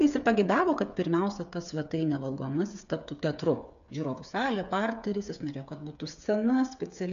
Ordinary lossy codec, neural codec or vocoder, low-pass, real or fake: MP3, 96 kbps; codec, 16 kHz, 8 kbps, FreqCodec, smaller model; 7.2 kHz; fake